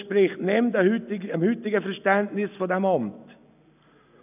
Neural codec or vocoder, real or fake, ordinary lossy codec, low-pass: none; real; none; 3.6 kHz